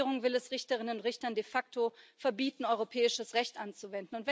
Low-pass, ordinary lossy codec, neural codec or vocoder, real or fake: none; none; none; real